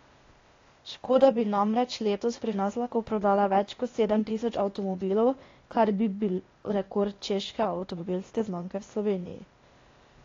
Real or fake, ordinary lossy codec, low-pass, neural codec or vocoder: fake; AAC, 32 kbps; 7.2 kHz; codec, 16 kHz, 0.8 kbps, ZipCodec